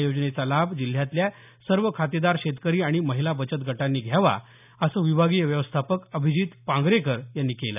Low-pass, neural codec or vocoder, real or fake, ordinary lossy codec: 3.6 kHz; none; real; none